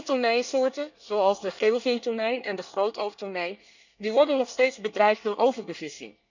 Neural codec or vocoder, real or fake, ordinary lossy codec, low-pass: codec, 24 kHz, 1 kbps, SNAC; fake; none; 7.2 kHz